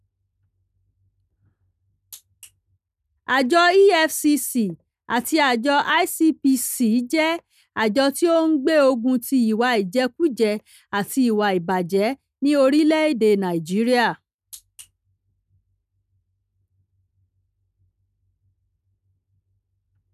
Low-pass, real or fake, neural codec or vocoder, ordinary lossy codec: 14.4 kHz; real; none; none